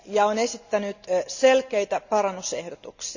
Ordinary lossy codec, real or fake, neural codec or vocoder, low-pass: none; real; none; 7.2 kHz